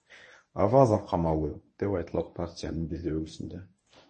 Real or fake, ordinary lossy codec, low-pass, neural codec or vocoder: fake; MP3, 32 kbps; 10.8 kHz; codec, 24 kHz, 0.9 kbps, WavTokenizer, medium speech release version 1